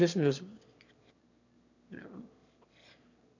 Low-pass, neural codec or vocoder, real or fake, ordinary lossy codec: 7.2 kHz; autoencoder, 22.05 kHz, a latent of 192 numbers a frame, VITS, trained on one speaker; fake; none